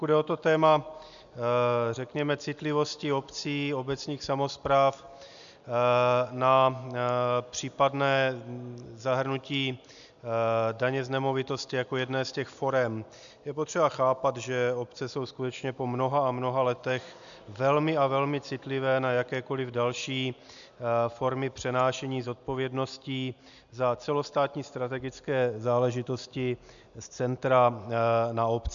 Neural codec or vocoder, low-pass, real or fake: none; 7.2 kHz; real